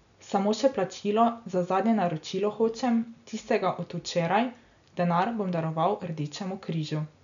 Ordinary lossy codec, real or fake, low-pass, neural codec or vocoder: none; real; 7.2 kHz; none